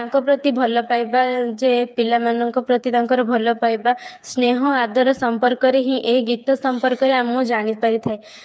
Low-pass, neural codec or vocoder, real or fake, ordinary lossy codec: none; codec, 16 kHz, 4 kbps, FreqCodec, smaller model; fake; none